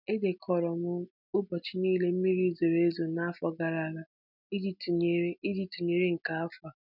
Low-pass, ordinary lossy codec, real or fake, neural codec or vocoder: 5.4 kHz; none; real; none